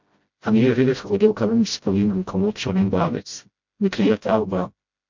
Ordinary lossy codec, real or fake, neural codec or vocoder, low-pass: MP3, 48 kbps; fake; codec, 16 kHz, 0.5 kbps, FreqCodec, smaller model; 7.2 kHz